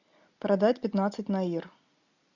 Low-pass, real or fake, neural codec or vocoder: 7.2 kHz; real; none